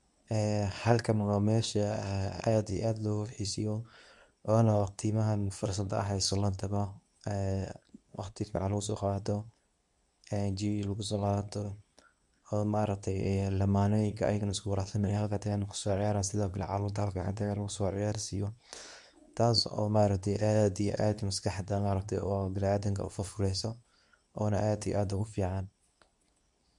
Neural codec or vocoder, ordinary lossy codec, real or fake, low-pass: codec, 24 kHz, 0.9 kbps, WavTokenizer, medium speech release version 2; none; fake; 10.8 kHz